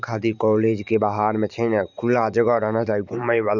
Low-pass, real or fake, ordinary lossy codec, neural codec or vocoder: 7.2 kHz; fake; none; vocoder, 44.1 kHz, 128 mel bands every 512 samples, BigVGAN v2